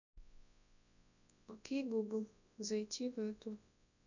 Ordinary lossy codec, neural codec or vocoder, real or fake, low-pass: none; codec, 24 kHz, 0.9 kbps, WavTokenizer, large speech release; fake; 7.2 kHz